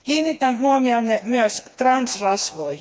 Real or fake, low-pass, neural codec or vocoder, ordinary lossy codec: fake; none; codec, 16 kHz, 2 kbps, FreqCodec, smaller model; none